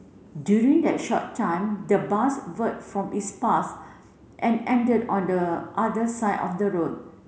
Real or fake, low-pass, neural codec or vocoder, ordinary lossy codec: real; none; none; none